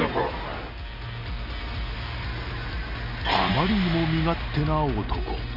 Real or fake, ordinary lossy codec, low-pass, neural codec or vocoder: real; none; 5.4 kHz; none